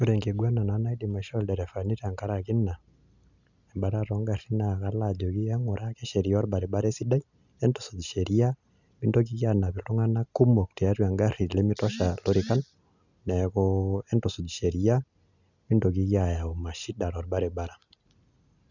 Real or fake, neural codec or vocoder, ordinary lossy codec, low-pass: real; none; none; 7.2 kHz